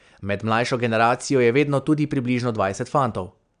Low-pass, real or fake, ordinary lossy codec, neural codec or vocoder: 9.9 kHz; real; none; none